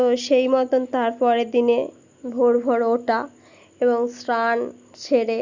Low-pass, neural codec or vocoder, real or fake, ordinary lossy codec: 7.2 kHz; none; real; Opus, 64 kbps